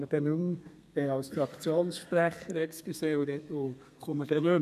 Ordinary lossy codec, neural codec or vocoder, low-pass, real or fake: none; codec, 32 kHz, 1.9 kbps, SNAC; 14.4 kHz; fake